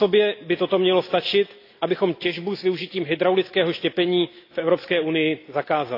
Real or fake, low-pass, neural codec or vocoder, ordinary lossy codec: real; 5.4 kHz; none; AAC, 32 kbps